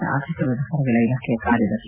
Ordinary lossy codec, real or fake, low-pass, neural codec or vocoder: Opus, 64 kbps; real; 3.6 kHz; none